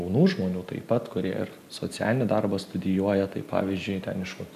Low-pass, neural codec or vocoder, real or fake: 14.4 kHz; none; real